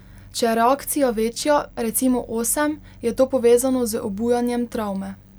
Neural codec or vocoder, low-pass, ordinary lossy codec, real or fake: none; none; none; real